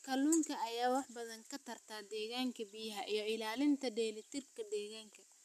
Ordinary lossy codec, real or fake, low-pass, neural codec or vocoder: none; real; 14.4 kHz; none